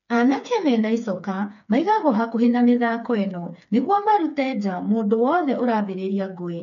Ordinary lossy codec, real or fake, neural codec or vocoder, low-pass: none; fake; codec, 16 kHz, 4 kbps, FreqCodec, smaller model; 7.2 kHz